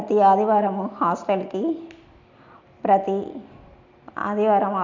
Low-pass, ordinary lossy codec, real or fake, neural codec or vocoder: 7.2 kHz; MP3, 64 kbps; real; none